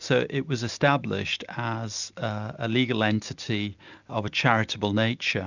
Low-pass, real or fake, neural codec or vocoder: 7.2 kHz; real; none